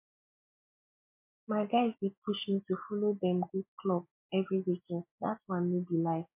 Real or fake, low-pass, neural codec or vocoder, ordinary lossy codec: real; 3.6 kHz; none; MP3, 24 kbps